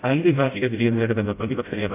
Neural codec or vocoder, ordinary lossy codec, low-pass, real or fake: codec, 16 kHz, 0.5 kbps, FreqCodec, smaller model; AAC, 24 kbps; 3.6 kHz; fake